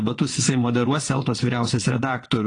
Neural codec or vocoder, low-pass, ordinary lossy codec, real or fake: vocoder, 22.05 kHz, 80 mel bands, WaveNeXt; 9.9 kHz; AAC, 32 kbps; fake